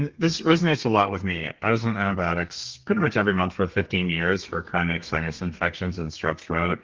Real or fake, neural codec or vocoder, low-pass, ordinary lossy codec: fake; codec, 32 kHz, 1.9 kbps, SNAC; 7.2 kHz; Opus, 32 kbps